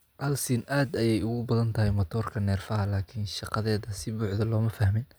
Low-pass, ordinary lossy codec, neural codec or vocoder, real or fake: none; none; none; real